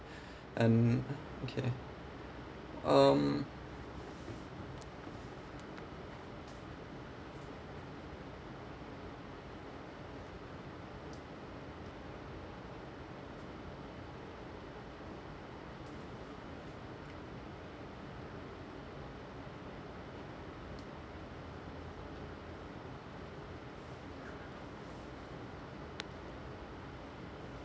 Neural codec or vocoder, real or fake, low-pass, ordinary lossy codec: none; real; none; none